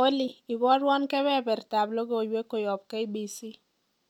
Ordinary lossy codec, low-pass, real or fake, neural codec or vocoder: none; 19.8 kHz; real; none